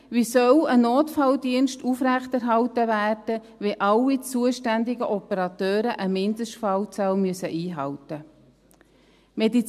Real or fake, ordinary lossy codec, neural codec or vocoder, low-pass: real; AAC, 96 kbps; none; 14.4 kHz